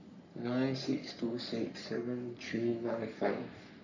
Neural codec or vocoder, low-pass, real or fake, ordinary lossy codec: codec, 44.1 kHz, 3.4 kbps, Pupu-Codec; 7.2 kHz; fake; none